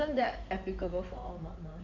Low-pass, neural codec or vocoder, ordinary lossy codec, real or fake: 7.2 kHz; codec, 16 kHz in and 24 kHz out, 2.2 kbps, FireRedTTS-2 codec; none; fake